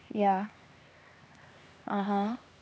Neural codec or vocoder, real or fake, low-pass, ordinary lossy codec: codec, 16 kHz, 2 kbps, X-Codec, HuBERT features, trained on LibriSpeech; fake; none; none